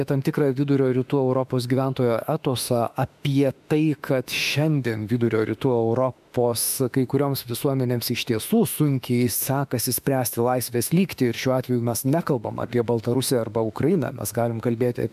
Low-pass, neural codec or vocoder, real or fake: 14.4 kHz; autoencoder, 48 kHz, 32 numbers a frame, DAC-VAE, trained on Japanese speech; fake